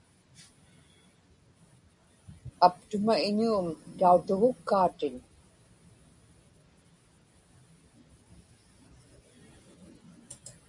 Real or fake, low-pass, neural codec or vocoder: real; 10.8 kHz; none